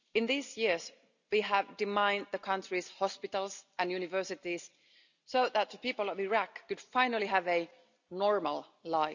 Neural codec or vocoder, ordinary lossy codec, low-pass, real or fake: none; none; 7.2 kHz; real